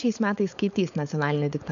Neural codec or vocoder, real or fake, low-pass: codec, 16 kHz, 4.8 kbps, FACodec; fake; 7.2 kHz